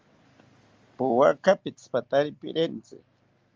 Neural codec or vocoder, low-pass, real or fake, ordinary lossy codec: none; 7.2 kHz; real; Opus, 32 kbps